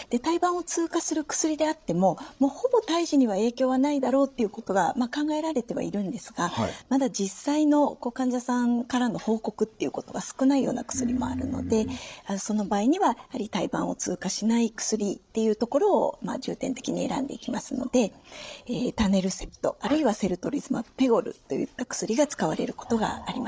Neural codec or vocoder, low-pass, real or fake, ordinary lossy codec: codec, 16 kHz, 16 kbps, FreqCodec, larger model; none; fake; none